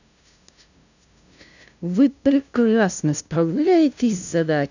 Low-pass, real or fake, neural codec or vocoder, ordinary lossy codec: 7.2 kHz; fake; codec, 16 kHz, 0.5 kbps, FunCodec, trained on LibriTTS, 25 frames a second; none